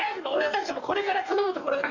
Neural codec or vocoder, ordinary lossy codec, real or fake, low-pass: codec, 44.1 kHz, 2.6 kbps, DAC; none; fake; 7.2 kHz